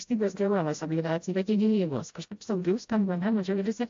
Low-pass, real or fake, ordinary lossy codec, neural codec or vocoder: 7.2 kHz; fake; AAC, 48 kbps; codec, 16 kHz, 0.5 kbps, FreqCodec, smaller model